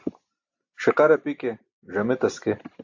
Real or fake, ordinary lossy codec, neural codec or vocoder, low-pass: real; AAC, 48 kbps; none; 7.2 kHz